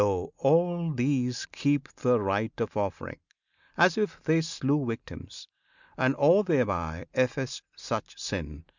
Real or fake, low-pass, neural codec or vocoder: real; 7.2 kHz; none